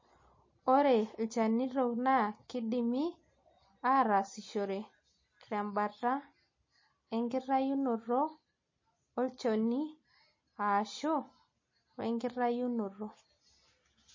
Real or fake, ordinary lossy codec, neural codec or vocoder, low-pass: real; MP3, 32 kbps; none; 7.2 kHz